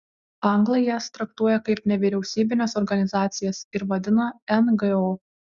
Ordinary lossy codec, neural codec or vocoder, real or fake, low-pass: Opus, 64 kbps; none; real; 7.2 kHz